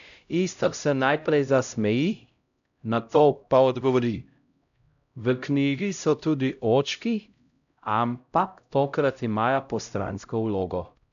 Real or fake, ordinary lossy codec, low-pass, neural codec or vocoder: fake; none; 7.2 kHz; codec, 16 kHz, 0.5 kbps, X-Codec, HuBERT features, trained on LibriSpeech